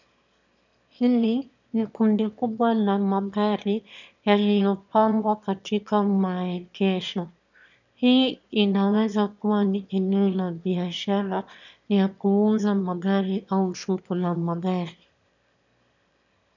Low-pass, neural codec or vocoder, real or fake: 7.2 kHz; autoencoder, 22.05 kHz, a latent of 192 numbers a frame, VITS, trained on one speaker; fake